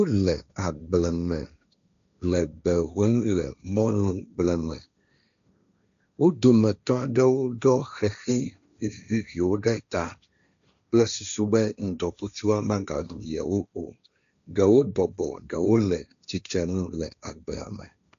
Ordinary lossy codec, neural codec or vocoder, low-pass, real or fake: AAC, 96 kbps; codec, 16 kHz, 1.1 kbps, Voila-Tokenizer; 7.2 kHz; fake